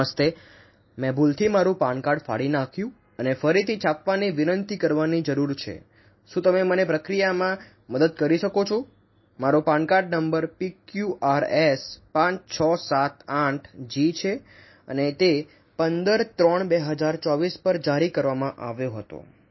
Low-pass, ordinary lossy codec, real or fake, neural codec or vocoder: 7.2 kHz; MP3, 24 kbps; real; none